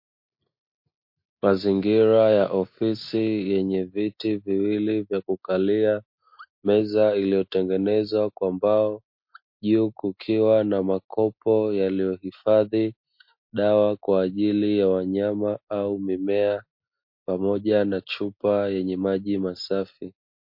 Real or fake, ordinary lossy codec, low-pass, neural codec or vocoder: real; MP3, 32 kbps; 5.4 kHz; none